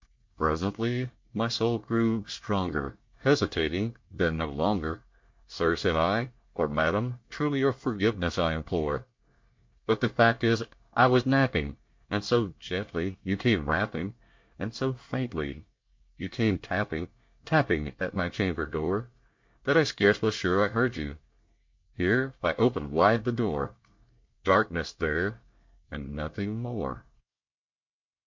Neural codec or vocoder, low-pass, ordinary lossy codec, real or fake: codec, 24 kHz, 1 kbps, SNAC; 7.2 kHz; MP3, 48 kbps; fake